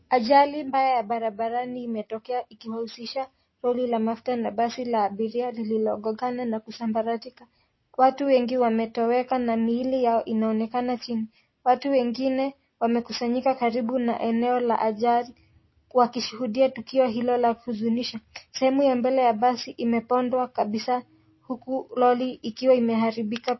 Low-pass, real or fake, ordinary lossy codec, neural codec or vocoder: 7.2 kHz; real; MP3, 24 kbps; none